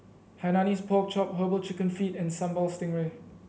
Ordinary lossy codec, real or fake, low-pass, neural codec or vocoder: none; real; none; none